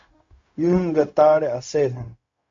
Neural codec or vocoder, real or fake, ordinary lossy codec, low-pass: codec, 16 kHz, 0.4 kbps, LongCat-Audio-Codec; fake; MP3, 48 kbps; 7.2 kHz